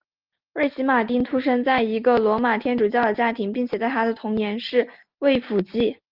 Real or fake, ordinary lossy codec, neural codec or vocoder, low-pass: real; Opus, 32 kbps; none; 5.4 kHz